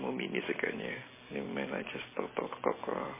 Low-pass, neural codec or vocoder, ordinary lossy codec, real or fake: 3.6 kHz; none; MP3, 16 kbps; real